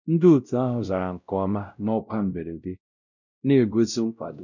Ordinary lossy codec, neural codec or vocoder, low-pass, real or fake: none; codec, 16 kHz, 0.5 kbps, X-Codec, WavLM features, trained on Multilingual LibriSpeech; 7.2 kHz; fake